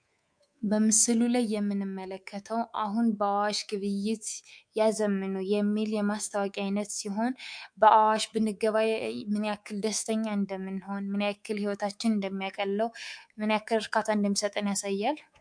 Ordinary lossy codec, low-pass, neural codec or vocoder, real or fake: MP3, 64 kbps; 9.9 kHz; codec, 24 kHz, 3.1 kbps, DualCodec; fake